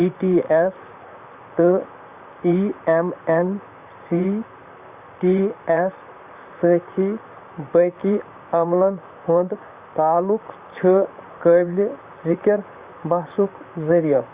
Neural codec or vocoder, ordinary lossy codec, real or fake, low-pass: vocoder, 44.1 kHz, 128 mel bands, Pupu-Vocoder; Opus, 64 kbps; fake; 3.6 kHz